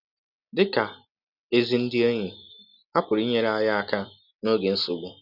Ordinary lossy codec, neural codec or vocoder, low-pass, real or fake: none; none; 5.4 kHz; real